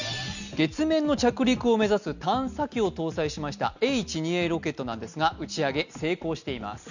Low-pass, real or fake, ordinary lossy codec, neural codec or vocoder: 7.2 kHz; real; none; none